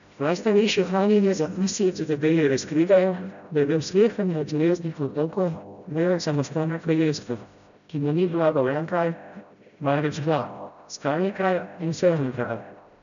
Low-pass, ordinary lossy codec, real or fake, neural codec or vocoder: 7.2 kHz; none; fake; codec, 16 kHz, 0.5 kbps, FreqCodec, smaller model